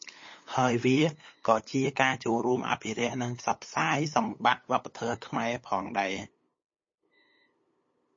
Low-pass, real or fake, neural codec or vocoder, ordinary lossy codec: 7.2 kHz; fake; codec, 16 kHz, 8 kbps, FunCodec, trained on LibriTTS, 25 frames a second; MP3, 32 kbps